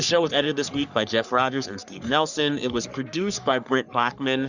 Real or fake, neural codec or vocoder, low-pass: fake; codec, 44.1 kHz, 3.4 kbps, Pupu-Codec; 7.2 kHz